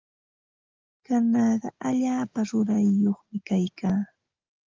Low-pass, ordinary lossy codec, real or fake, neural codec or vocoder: 7.2 kHz; Opus, 32 kbps; real; none